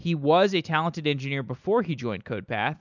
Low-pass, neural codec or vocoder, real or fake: 7.2 kHz; none; real